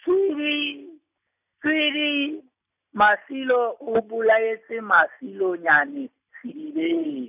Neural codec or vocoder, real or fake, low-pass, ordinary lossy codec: none; real; 3.6 kHz; none